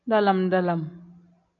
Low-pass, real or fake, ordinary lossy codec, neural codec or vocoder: 7.2 kHz; real; MP3, 64 kbps; none